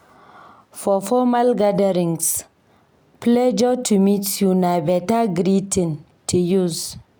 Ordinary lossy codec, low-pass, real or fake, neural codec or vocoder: none; none; real; none